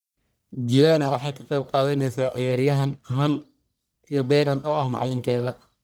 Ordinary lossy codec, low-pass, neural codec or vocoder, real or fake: none; none; codec, 44.1 kHz, 1.7 kbps, Pupu-Codec; fake